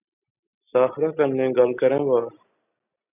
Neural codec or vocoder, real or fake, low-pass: none; real; 3.6 kHz